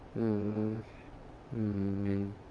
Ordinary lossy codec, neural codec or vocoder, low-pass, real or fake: none; vocoder, 22.05 kHz, 80 mel bands, WaveNeXt; none; fake